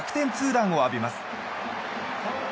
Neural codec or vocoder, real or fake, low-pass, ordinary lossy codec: none; real; none; none